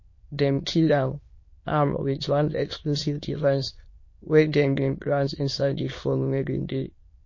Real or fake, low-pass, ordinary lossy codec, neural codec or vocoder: fake; 7.2 kHz; MP3, 32 kbps; autoencoder, 22.05 kHz, a latent of 192 numbers a frame, VITS, trained on many speakers